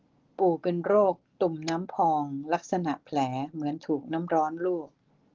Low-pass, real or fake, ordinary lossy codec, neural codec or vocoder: 7.2 kHz; real; Opus, 16 kbps; none